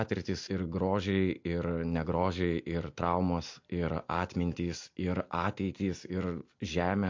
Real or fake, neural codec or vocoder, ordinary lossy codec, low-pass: real; none; MP3, 48 kbps; 7.2 kHz